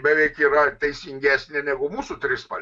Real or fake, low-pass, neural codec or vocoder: real; 9.9 kHz; none